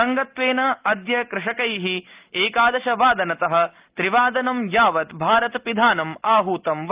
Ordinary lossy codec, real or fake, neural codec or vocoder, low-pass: Opus, 32 kbps; real; none; 3.6 kHz